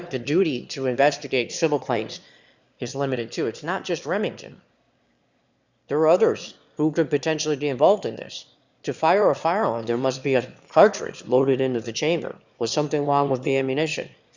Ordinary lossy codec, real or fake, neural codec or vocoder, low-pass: Opus, 64 kbps; fake; autoencoder, 22.05 kHz, a latent of 192 numbers a frame, VITS, trained on one speaker; 7.2 kHz